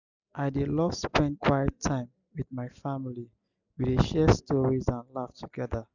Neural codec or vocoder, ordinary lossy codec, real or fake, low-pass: none; none; real; 7.2 kHz